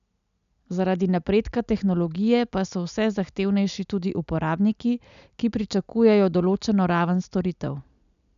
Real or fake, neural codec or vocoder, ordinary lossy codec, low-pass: real; none; none; 7.2 kHz